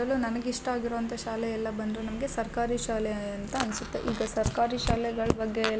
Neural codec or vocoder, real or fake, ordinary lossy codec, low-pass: none; real; none; none